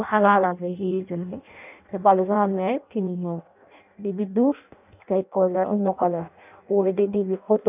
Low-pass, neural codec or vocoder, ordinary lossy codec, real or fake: 3.6 kHz; codec, 16 kHz in and 24 kHz out, 0.6 kbps, FireRedTTS-2 codec; none; fake